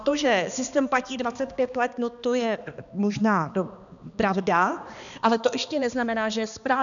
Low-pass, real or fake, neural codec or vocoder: 7.2 kHz; fake; codec, 16 kHz, 2 kbps, X-Codec, HuBERT features, trained on balanced general audio